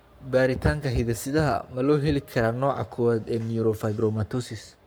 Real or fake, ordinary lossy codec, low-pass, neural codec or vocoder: fake; none; none; codec, 44.1 kHz, 7.8 kbps, Pupu-Codec